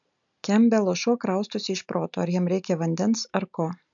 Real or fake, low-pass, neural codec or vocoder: real; 7.2 kHz; none